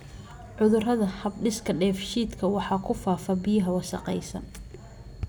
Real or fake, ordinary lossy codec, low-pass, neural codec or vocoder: real; none; none; none